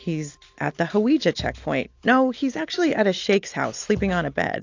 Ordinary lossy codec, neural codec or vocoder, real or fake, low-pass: AAC, 48 kbps; none; real; 7.2 kHz